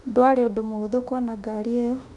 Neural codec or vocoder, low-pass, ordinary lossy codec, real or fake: autoencoder, 48 kHz, 32 numbers a frame, DAC-VAE, trained on Japanese speech; 10.8 kHz; none; fake